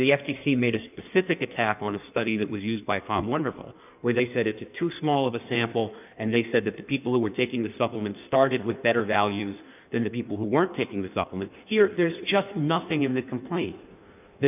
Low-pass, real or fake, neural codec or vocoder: 3.6 kHz; fake; codec, 16 kHz in and 24 kHz out, 1.1 kbps, FireRedTTS-2 codec